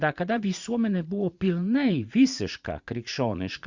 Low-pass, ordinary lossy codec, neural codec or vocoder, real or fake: 7.2 kHz; Opus, 64 kbps; vocoder, 44.1 kHz, 80 mel bands, Vocos; fake